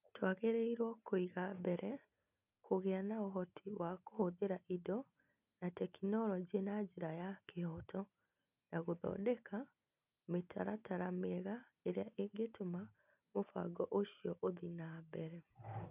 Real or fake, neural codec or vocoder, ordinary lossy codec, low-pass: real; none; none; 3.6 kHz